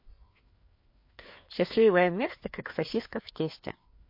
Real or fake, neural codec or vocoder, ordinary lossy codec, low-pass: fake; codec, 16 kHz, 2 kbps, FreqCodec, larger model; MP3, 32 kbps; 5.4 kHz